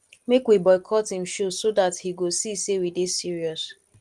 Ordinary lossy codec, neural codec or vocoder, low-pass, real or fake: Opus, 24 kbps; none; 10.8 kHz; real